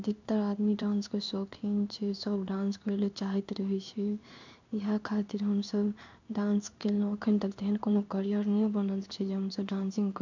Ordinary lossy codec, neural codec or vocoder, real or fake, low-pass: none; codec, 16 kHz in and 24 kHz out, 1 kbps, XY-Tokenizer; fake; 7.2 kHz